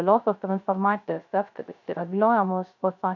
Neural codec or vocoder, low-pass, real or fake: codec, 16 kHz, 0.3 kbps, FocalCodec; 7.2 kHz; fake